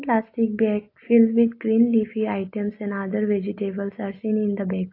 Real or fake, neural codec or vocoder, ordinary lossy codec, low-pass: real; none; AAC, 32 kbps; 5.4 kHz